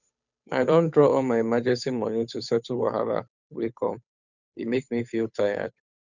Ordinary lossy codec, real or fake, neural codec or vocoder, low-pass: none; fake; codec, 16 kHz, 8 kbps, FunCodec, trained on Chinese and English, 25 frames a second; 7.2 kHz